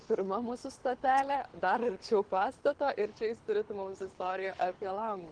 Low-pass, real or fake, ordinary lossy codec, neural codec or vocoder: 9.9 kHz; fake; Opus, 16 kbps; codec, 24 kHz, 6 kbps, HILCodec